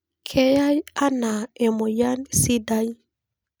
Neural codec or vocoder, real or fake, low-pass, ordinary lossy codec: none; real; none; none